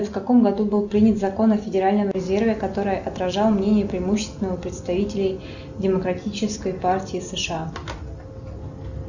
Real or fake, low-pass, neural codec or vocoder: real; 7.2 kHz; none